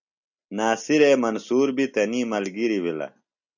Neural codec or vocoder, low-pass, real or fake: none; 7.2 kHz; real